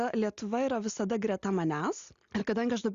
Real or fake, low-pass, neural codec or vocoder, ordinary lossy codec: real; 7.2 kHz; none; Opus, 64 kbps